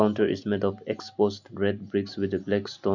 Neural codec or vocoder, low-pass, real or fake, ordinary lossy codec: none; 7.2 kHz; real; none